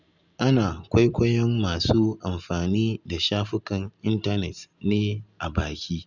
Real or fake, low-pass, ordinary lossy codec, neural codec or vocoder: real; 7.2 kHz; Opus, 64 kbps; none